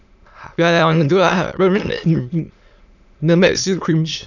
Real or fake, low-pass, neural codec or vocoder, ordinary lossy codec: fake; 7.2 kHz; autoencoder, 22.05 kHz, a latent of 192 numbers a frame, VITS, trained on many speakers; none